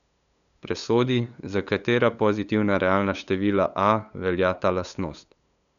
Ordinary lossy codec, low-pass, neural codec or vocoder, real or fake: none; 7.2 kHz; codec, 16 kHz, 8 kbps, FunCodec, trained on LibriTTS, 25 frames a second; fake